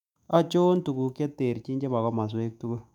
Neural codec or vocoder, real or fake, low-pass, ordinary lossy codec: autoencoder, 48 kHz, 128 numbers a frame, DAC-VAE, trained on Japanese speech; fake; 19.8 kHz; none